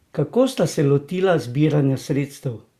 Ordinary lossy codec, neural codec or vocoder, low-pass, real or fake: Opus, 64 kbps; none; 14.4 kHz; real